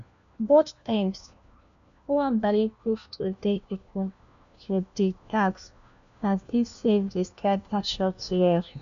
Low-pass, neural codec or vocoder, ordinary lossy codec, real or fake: 7.2 kHz; codec, 16 kHz, 1 kbps, FunCodec, trained on LibriTTS, 50 frames a second; none; fake